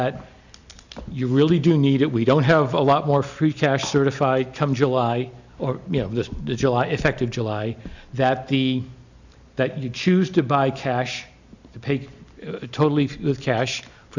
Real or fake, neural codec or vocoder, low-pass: real; none; 7.2 kHz